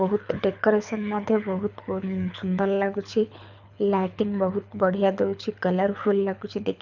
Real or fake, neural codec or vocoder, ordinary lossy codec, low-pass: fake; codec, 24 kHz, 6 kbps, HILCodec; none; 7.2 kHz